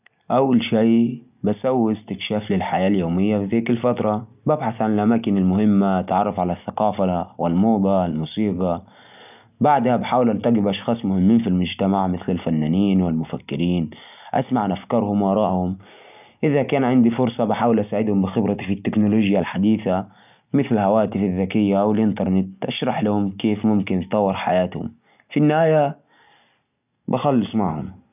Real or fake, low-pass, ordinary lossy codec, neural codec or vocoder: real; 3.6 kHz; none; none